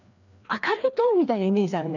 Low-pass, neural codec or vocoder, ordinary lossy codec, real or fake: 7.2 kHz; codec, 16 kHz, 2 kbps, FreqCodec, larger model; none; fake